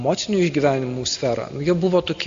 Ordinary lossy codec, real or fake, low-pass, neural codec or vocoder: AAC, 64 kbps; real; 7.2 kHz; none